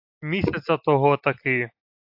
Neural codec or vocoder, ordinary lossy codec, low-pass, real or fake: none; AAC, 48 kbps; 5.4 kHz; real